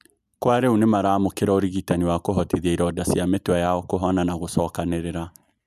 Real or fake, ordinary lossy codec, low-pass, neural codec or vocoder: real; none; 14.4 kHz; none